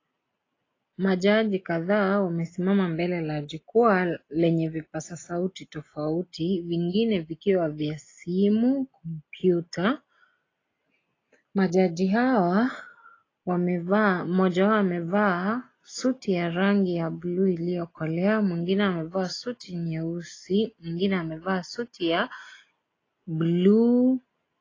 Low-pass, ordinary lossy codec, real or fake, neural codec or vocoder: 7.2 kHz; AAC, 32 kbps; real; none